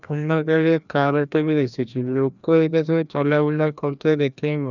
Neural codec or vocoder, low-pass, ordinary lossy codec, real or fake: codec, 16 kHz, 1 kbps, FreqCodec, larger model; 7.2 kHz; none; fake